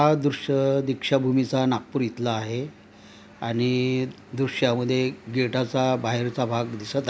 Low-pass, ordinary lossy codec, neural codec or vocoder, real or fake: none; none; none; real